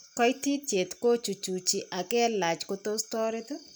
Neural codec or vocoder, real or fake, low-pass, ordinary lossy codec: none; real; none; none